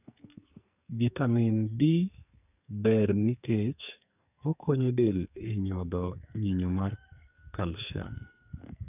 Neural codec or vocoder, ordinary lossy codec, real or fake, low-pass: codec, 44.1 kHz, 2.6 kbps, SNAC; none; fake; 3.6 kHz